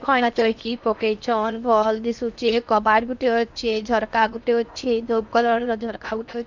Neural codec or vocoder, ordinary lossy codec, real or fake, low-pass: codec, 16 kHz in and 24 kHz out, 0.8 kbps, FocalCodec, streaming, 65536 codes; none; fake; 7.2 kHz